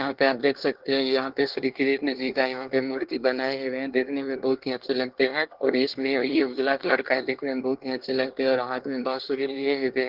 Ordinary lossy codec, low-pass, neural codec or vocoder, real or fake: Opus, 16 kbps; 5.4 kHz; codec, 24 kHz, 1 kbps, SNAC; fake